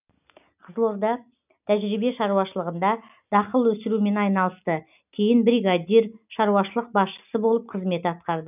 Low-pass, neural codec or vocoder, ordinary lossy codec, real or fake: 3.6 kHz; none; none; real